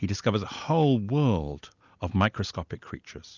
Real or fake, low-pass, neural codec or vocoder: real; 7.2 kHz; none